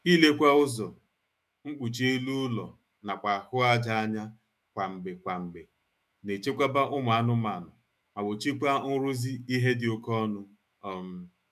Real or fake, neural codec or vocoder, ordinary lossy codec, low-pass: fake; autoencoder, 48 kHz, 128 numbers a frame, DAC-VAE, trained on Japanese speech; none; 14.4 kHz